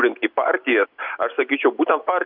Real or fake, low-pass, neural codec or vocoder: real; 5.4 kHz; none